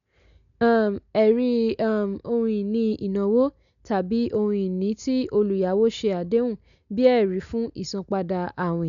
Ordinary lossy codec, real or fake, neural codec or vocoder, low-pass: none; real; none; 7.2 kHz